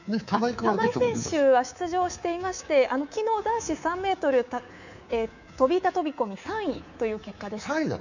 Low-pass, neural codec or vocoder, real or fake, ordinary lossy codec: 7.2 kHz; codec, 24 kHz, 3.1 kbps, DualCodec; fake; none